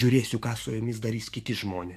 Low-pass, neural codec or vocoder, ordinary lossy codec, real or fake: 14.4 kHz; codec, 44.1 kHz, 7.8 kbps, DAC; MP3, 64 kbps; fake